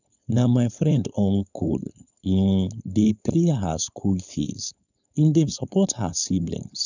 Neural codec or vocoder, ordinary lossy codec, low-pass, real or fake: codec, 16 kHz, 4.8 kbps, FACodec; none; 7.2 kHz; fake